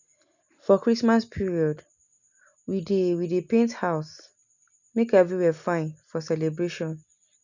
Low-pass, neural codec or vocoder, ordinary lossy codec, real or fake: 7.2 kHz; none; none; real